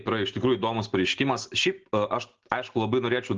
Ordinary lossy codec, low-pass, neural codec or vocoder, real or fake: Opus, 16 kbps; 7.2 kHz; none; real